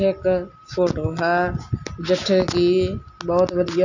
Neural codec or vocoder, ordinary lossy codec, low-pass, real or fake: none; none; 7.2 kHz; real